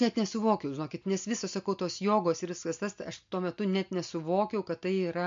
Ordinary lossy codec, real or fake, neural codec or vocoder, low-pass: MP3, 48 kbps; real; none; 7.2 kHz